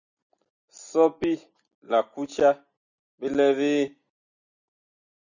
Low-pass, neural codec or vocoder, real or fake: 7.2 kHz; none; real